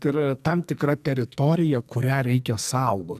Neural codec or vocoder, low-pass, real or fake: codec, 44.1 kHz, 2.6 kbps, SNAC; 14.4 kHz; fake